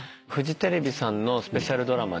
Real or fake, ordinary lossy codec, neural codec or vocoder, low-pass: real; none; none; none